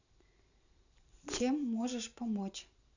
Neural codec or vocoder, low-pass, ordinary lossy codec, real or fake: vocoder, 44.1 kHz, 128 mel bands every 512 samples, BigVGAN v2; 7.2 kHz; none; fake